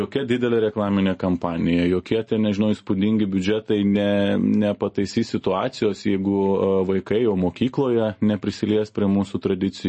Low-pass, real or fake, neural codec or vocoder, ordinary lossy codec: 10.8 kHz; real; none; MP3, 32 kbps